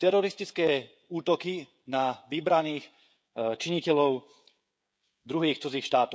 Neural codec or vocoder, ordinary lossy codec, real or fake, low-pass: codec, 16 kHz, 16 kbps, FreqCodec, smaller model; none; fake; none